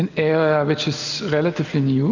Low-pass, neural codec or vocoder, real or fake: 7.2 kHz; none; real